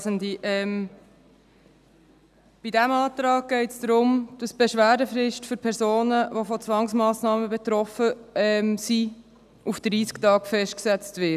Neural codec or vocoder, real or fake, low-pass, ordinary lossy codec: none; real; 14.4 kHz; none